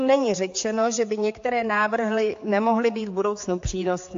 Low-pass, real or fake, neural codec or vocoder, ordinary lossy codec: 7.2 kHz; fake; codec, 16 kHz, 4 kbps, X-Codec, HuBERT features, trained on general audio; AAC, 48 kbps